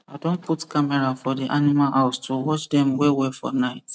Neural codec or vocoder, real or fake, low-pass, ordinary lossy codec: none; real; none; none